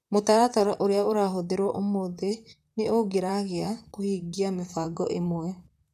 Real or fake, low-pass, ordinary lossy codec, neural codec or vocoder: fake; 14.4 kHz; none; vocoder, 44.1 kHz, 128 mel bands, Pupu-Vocoder